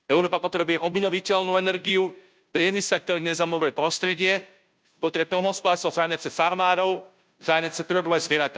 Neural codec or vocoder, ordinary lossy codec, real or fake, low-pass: codec, 16 kHz, 0.5 kbps, FunCodec, trained on Chinese and English, 25 frames a second; none; fake; none